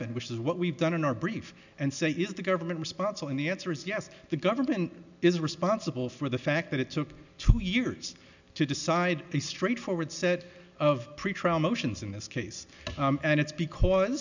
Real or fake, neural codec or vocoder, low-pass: real; none; 7.2 kHz